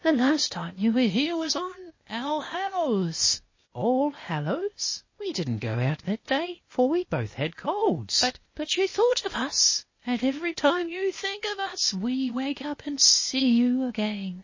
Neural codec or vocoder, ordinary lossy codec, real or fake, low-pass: codec, 16 kHz, 0.8 kbps, ZipCodec; MP3, 32 kbps; fake; 7.2 kHz